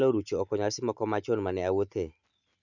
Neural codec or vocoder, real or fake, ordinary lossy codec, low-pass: none; real; none; 7.2 kHz